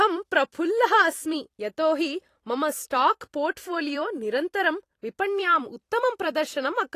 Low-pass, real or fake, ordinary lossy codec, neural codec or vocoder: 14.4 kHz; fake; AAC, 48 kbps; vocoder, 44.1 kHz, 128 mel bands every 256 samples, BigVGAN v2